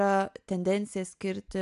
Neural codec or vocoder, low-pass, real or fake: none; 10.8 kHz; real